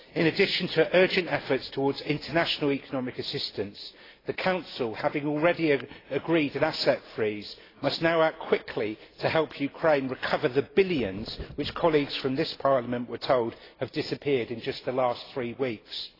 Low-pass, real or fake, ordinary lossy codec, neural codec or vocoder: 5.4 kHz; real; AAC, 24 kbps; none